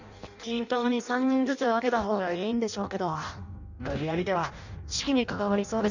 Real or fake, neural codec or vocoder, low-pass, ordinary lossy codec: fake; codec, 16 kHz in and 24 kHz out, 0.6 kbps, FireRedTTS-2 codec; 7.2 kHz; none